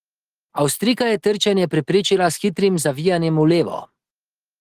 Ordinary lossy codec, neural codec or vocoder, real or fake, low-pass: Opus, 16 kbps; none; real; 14.4 kHz